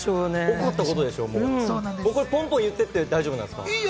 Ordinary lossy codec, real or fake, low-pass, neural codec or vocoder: none; real; none; none